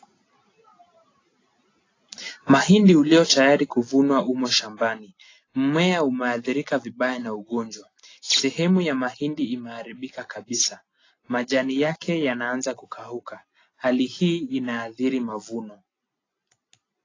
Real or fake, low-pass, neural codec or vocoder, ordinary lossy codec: real; 7.2 kHz; none; AAC, 32 kbps